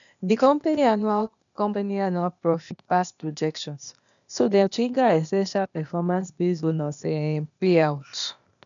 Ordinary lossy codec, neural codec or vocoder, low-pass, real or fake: none; codec, 16 kHz, 0.8 kbps, ZipCodec; 7.2 kHz; fake